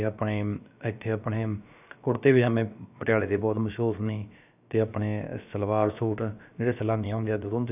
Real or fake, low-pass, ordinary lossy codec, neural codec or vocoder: fake; 3.6 kHz; none; codec, 16 kHz, about 1 kbps, DyCAST, with the encoder's durations